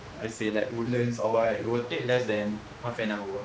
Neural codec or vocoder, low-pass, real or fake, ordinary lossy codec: codec, 16 kHz, 2 kbps, X-Codec, HuBERT features, trained on general audio; none; fake; none